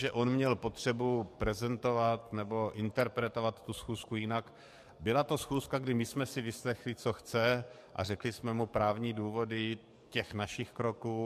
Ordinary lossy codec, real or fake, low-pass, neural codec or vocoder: MP3, 64 kbps; fake; 14.4 kHz; codec, 44.1 kHz, 7.8 kbps, DAC